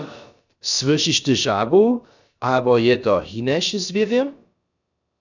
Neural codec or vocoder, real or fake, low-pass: codec, 16 kHz, about 1 kbps, DyCAST, with the encoder's durations; fake; 7.2 kHz